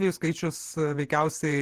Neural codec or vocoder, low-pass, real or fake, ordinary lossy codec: vocoder, 48 kHz, 128 mel bands, Vocos; 14.4 kHz; fake; Opus, 16 kbps